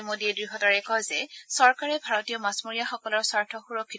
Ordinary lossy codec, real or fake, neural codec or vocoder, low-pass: none; real; none; none